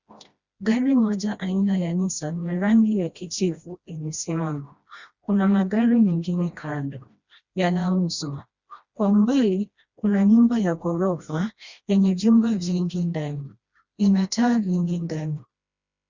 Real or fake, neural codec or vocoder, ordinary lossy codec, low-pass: fake; codec, 16 kHz, 1 kbps, FreqCodec, smaller model; Opus, 64 kbps; 7.2 kHz